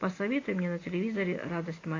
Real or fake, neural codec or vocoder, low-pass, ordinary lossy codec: real; none; 7.2 kHz; AAC, 32 kbps